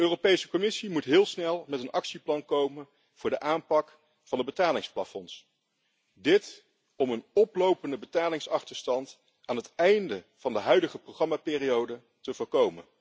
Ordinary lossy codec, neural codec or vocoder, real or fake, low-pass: none; none; real; none